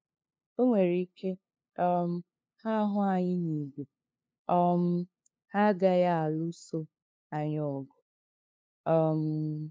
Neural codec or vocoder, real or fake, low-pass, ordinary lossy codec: codec, 16 kHz, 2 kbps, FunCodec, trained on LibriTTS, 25 frames a second; fake; none; none